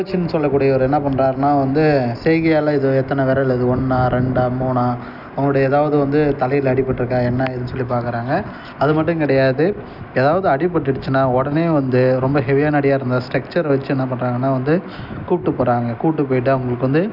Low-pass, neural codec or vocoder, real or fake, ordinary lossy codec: 5.4 kHz; none; real; none